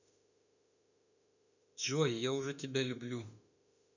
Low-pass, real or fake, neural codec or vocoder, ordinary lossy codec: 7.2 kHz; fake; autoencoder, 48 kHz, 32 numbers a frame, DAC-VAE, trained on Japanese speech; none